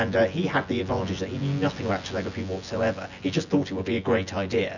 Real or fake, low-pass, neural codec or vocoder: fake; 7.2 kHz; vocoder, 24 kHz, 100 mel bands, Vocos